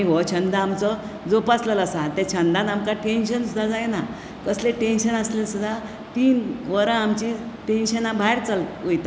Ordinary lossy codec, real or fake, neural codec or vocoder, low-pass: none; real; none; none